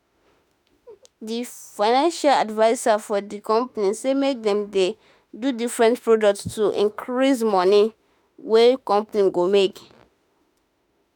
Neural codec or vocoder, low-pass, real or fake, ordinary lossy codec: autoencoder, 48 kHz, 32 numbers a frame, DAC-VAE, trained on Japanese speech; none; fake; none